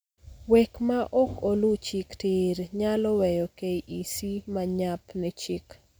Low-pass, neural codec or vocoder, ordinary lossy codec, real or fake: none; none; none; real